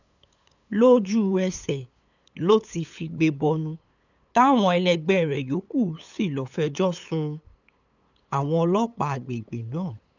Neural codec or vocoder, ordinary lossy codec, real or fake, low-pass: codec, 16 kHz, 8 kbps, FunCodec, trained on LibriTTS, 25 frames a second; none; fake; 7.2 kHz